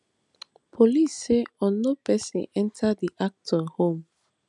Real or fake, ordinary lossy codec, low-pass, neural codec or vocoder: real; none; 10.8 kHz; none